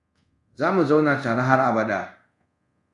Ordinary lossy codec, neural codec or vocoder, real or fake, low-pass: MP3, 64 kbps; codec, 24 kHz, 0.5 kbps, DualCodec; fake; 10.8 kHz